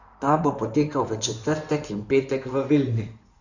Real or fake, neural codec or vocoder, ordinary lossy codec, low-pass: fake; codec, 16 kHz in and 24 kHz out, 2.2 kbps, FireRedTTS-2 codec; none; 7.2 kHz